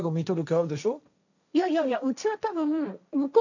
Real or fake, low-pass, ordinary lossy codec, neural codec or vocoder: fake; 7.2 kHz; none; codec, 16 kHz, 1.1 kbps, Voila-Tokenizer